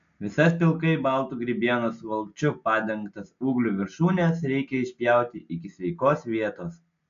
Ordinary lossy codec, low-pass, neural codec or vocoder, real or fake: AAC, 64 kbps; 7.2 kHz; none; real